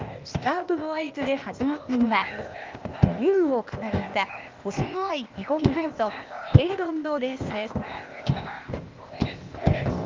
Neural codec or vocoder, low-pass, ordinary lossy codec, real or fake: codec, 16 kHz, 0.8 kbps, ZipCodec; 7.2 kHz; Opus, 24 kbps; fake